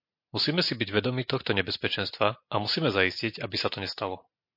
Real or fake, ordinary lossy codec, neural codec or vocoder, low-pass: real; MP3, 32 kbps; none; 5.4 kHz